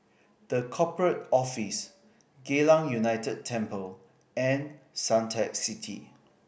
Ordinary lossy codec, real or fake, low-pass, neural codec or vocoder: none; real; none; none